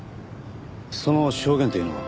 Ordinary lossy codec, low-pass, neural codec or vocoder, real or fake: none; none; none; real